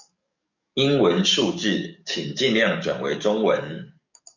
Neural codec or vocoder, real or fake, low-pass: codec, 44.1 kHz, 7.8 kbps, DAC; fake; 7.2 kHz